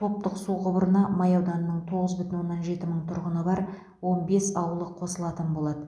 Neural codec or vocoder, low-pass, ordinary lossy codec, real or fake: none; none; none; real